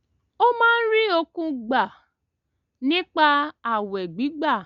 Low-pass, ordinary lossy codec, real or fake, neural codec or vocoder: 7.2 kHz; Opus, 64 kbps; real; none